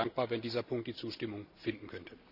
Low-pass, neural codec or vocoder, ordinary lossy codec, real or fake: 5.4 kHz; none; none; real